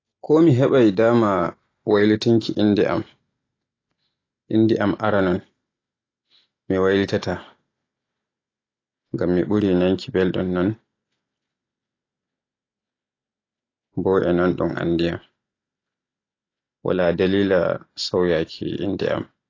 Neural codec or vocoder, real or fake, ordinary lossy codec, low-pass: none; real; AAC, 32 kbps; 7.2 kHz